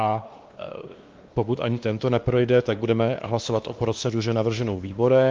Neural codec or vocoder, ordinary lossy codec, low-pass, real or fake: codec, 16 kHz, 2 kbps, X-Codec, WavLM features, trained on Multilingual LibriSpeech; Opus, 32 kbps; 7.2 kHz; fake